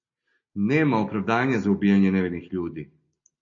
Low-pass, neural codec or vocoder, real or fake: 7.2 kHz; none; real